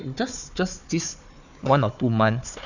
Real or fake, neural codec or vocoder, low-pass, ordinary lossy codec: fake; codec, 16 kHz, 4 kbps, FunCodec, trained on Chinese and English, 50 frames a second; 7.2 kHz; none